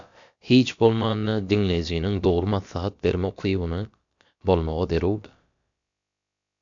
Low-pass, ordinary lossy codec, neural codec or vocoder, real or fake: 7.2 kHz; AAC, 64 kbps; codec, 16 kHz, about 1 kbps, DyCAST, with the encoder's durations; fake